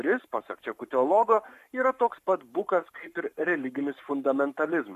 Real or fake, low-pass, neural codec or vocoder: fake; 14.4 kHz; codec, 44.1 kHz, 7.8 kbps, Pupu-Codec